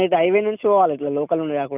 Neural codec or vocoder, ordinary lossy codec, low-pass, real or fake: none; none; 3.6 kHz; real